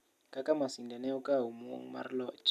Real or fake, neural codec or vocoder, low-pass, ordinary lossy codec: real; none; 14.4 kHz; none